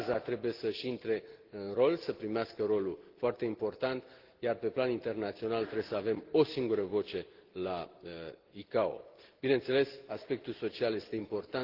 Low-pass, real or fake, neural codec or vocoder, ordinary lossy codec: 5.4 kHz; real; none; Opus, 16 kbps